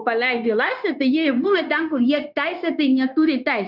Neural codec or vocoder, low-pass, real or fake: codec, 16 kHz, 0.9 kbps, LongCat-Audio-Codec; 5.4 kHz; fake